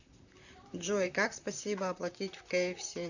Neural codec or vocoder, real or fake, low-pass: vocoder, 44.1 kHz, 128 mel bands, Pupu-Vocoder; fake; 7.2 kHz